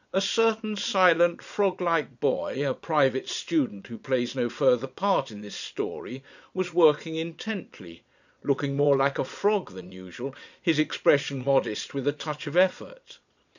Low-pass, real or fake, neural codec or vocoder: 7.2 kHz; fake; vocoder, 22.05 kHz, 80 mel bands, Vocos